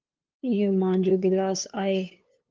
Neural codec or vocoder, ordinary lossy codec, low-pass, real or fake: codec, 16 kHz, 2 kbps, FunCodec, trained on LibriTTS, 25 frames a second; Opus, 32 kbps; 7.2 kHz; fake